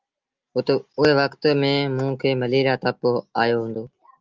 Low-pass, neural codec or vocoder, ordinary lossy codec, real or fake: 7.2 kHz; none; Opus, 32 kbps; real